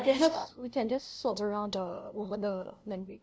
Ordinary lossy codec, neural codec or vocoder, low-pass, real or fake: none; codec, 16 kHz, 0.5 kbps, FunCodec, trained on LibriTTS, 25 frames a second; none; fake